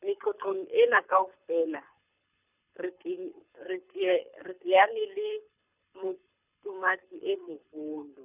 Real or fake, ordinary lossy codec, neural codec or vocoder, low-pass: fake; none; codec, 24 kHz, 6 kbps, HILCodec; 3.6 kHz